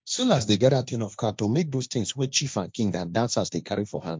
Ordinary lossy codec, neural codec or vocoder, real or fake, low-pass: none; codec, 16 kHz, 1.1 kbps, Voila-Tokenizer; fake; none